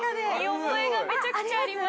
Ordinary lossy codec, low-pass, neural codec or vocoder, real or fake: none; none; none; real